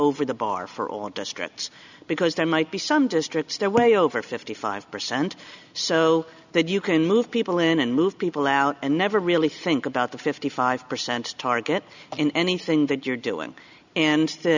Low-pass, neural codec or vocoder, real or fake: 7.2 kHz; none; real